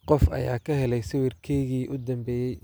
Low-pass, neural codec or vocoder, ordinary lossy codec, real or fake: none; none; none; real